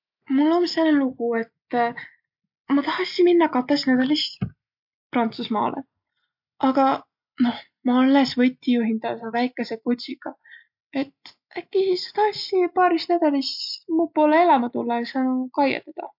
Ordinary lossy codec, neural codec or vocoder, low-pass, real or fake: MP3, 48 kbps; none; 5.4 kHz; real